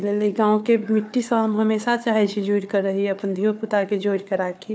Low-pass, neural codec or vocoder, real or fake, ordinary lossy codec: none; codec, 16 kHz, 4 kbps, FunCodec, trained on Chinese and English, 50 frames a second; fake; none